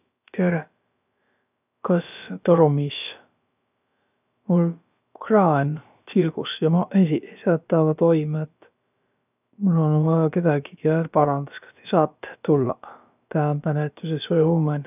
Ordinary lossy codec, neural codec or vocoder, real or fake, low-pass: none; codec, 16 kHz, about 1 kbps, DyCAST, with the encoder's durations; fake; 3.6 kHz